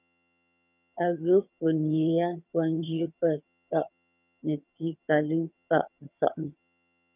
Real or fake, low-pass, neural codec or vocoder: fake; 3.6 kHz; vocoder, 22.05 kHz, 80 mel bands, HiFi-GAN